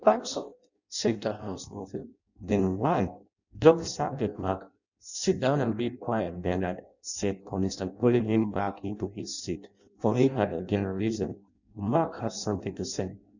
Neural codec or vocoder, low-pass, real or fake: codec, 16 kHz in and 24 kHz out, 0.6 kbps, FireRedTTS-2 codec; 7.2 kHz; fake